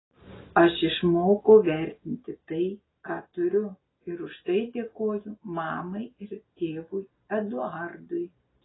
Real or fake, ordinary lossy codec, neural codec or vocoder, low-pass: real; AAC, 16 kbps; none; 7.2 kHz